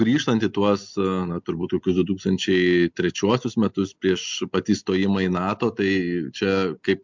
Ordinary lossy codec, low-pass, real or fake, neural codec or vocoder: MP3, 64 kbps; 7.2 kHz; real; none